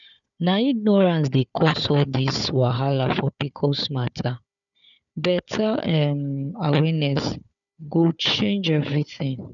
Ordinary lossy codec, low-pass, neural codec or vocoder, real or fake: none; 7.2 kHz; codec, 16 kHz, 4 kbps, FunCodec, trained on Chinese and English, 50 frames a second; fake